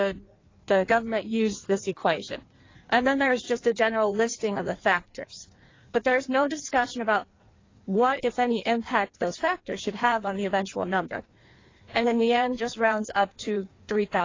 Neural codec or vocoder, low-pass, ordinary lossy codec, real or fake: codec, 16 kHz in and 24 kHz out, 1.1 kbps, FireRedTTS-2 codec; 7.2 kHz; AAC, 32 kbps; fake